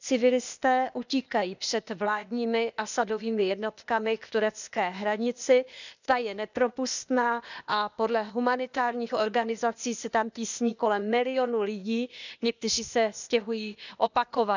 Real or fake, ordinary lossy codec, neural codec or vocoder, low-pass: fake; none; codec, 16 kHz, 0.8 kbps, ZipCodec; 7.2 kHz